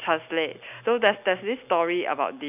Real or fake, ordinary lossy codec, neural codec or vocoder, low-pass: real; none; none; 3.6 kHz